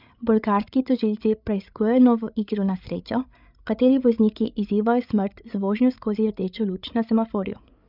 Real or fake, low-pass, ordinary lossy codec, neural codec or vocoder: fake; 5.4 kHz; none; codec, 16 kHz, 8 kbps, FreqCodec, larger model